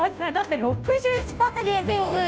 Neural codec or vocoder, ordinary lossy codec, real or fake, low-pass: codec, 16 kHz, 0.5 kbps, FunCodec, trained on Chinese and English, 25 frames a second; none; fake; none